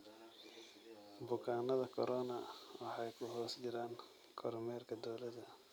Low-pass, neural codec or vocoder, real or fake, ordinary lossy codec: none; none; real; none